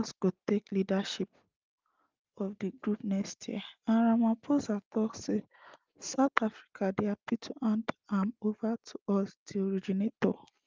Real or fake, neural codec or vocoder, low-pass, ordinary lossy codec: real; none; 7.2 kHz; Opus, 24 kbps